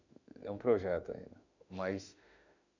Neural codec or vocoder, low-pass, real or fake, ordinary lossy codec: autoencoder, 48 kHz, 32 numbers a frame, DAC-VAE, trained on Japanese speech; 7.2 kHz; fake; none